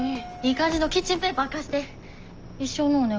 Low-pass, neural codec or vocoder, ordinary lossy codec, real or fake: 7.2 kHz; none; Opus, 24 kbps; real